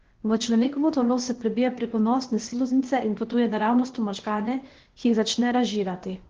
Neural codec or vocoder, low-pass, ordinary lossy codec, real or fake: codec, 16 kHz, 0.8 kbps, ZipCodec; 7.2 kHz; Opus, 16 kbps; fake